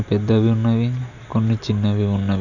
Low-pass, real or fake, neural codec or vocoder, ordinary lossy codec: 7.2 kHz; real; none; none